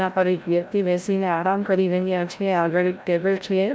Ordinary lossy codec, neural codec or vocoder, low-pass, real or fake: none; codec, 16 kHz, 0.5 kbps, FreqCodec, larger model; none; fake